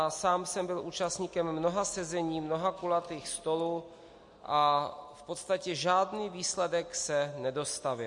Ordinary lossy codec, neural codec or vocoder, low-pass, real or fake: MP3, 48 kbps; none; 10.8 kHz; real